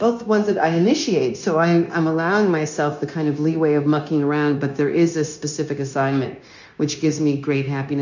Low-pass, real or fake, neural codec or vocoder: 7.2 kHz; fake; codec, 16 kHz, 0.9 kbps, LongCat-Audio-Codec